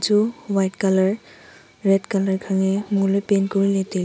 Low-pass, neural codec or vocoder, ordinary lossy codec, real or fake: none; none; none; real